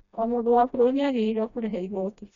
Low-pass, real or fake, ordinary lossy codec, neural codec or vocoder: 7.2 kHz; fake; none; codec, 16 kHz, 1 kbps, FreqCodec, smaller model